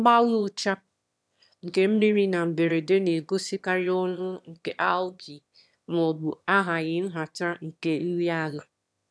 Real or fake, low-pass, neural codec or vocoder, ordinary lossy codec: fake; none; autoencoder, 22.05 kHz, a latent of 192 numbers a frame, VITS, trained on one speaker; none